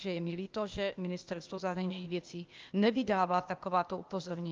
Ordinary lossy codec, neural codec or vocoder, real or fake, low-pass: Opus, 24 kbps; codec, 16 kHz, 0.8 kbps, ZipCodec; fake; 7.2 kHz